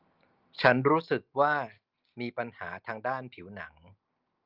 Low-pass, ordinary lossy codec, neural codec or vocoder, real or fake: 5.4 kHz; Opus, 24 kbps; none; real